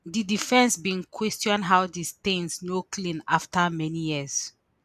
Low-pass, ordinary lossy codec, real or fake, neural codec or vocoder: 14.4 kHz; none; real; none